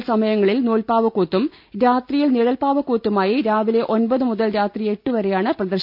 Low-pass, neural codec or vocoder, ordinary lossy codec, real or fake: 5.4 kHz; none; none; real